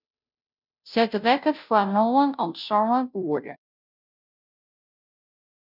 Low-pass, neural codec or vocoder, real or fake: 5.4 kHz; codec, 16 kHz, 0.5 kbps, FunCodec, trained on Chinese and English, 25 frames a second; fake